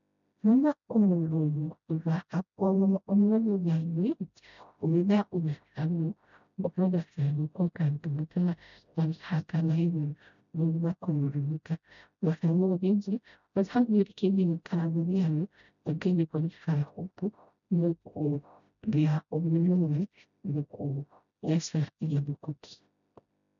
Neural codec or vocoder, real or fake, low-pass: codec, 16 kHz, 0.5 kbps, FreqCodec, smaller model; fake; 7.2 kHz